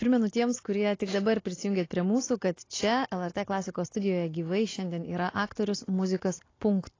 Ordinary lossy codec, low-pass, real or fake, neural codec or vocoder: AAC, 32 kbps; 7.2 kHz; real; none